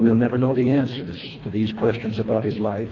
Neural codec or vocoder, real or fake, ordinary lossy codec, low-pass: codec, 24 kHz, 1.5 kbps, HILCodec; fake; AAC, 32 kbps; 7.2 kHz